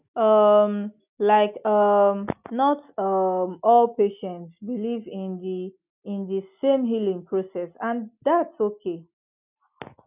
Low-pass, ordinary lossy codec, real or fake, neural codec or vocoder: 3.6 kHz; none; real; none